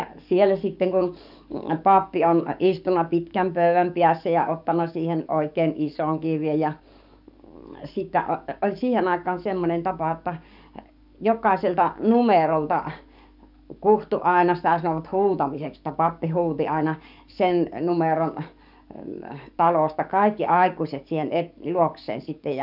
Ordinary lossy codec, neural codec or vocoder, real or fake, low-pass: none; codec, 44.1 kHz, 7.8 kbps, DAC; fake; 5.4 kHz